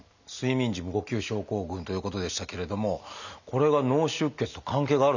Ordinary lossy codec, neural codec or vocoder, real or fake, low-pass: none; none; real; 7.2 kHz